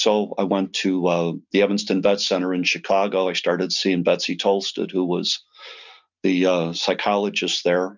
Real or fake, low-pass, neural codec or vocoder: real; 7.2 kHz; none